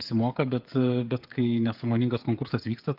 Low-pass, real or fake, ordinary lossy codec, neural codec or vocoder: 5.4 kHz; fake; Opus, 24 kbps; codec, 16 kHz, 8 kbps, FreqCodec, smaller model